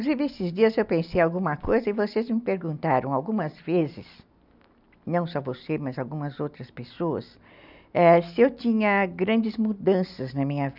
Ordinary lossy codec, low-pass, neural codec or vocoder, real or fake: none; 5.4 kHz; none; real